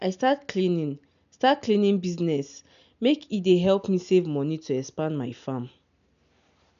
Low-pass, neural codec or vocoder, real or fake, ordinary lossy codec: 7.2 kHz; none; real; none